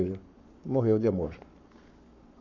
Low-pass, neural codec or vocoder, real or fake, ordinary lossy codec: 7.2 kHz; codec, 44.1 kHz, 7.8 kbps, Pupu-Codec; fake; none